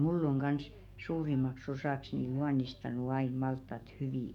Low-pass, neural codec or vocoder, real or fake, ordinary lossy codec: 19.8 kHz; codec, 44.1 kHz, 7.8 kbps, DAC; fake; none